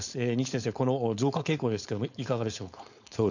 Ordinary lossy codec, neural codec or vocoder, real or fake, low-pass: none; codec, 16 kHz, 4.8 kbps, FACodec; fake; 7.2 kHz